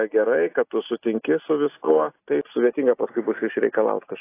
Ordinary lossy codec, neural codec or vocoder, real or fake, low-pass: AAC, 24 kbps; none; real; 3.6 kHz